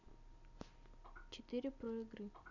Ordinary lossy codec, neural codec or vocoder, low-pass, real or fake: none; none; 7.2 kHz; real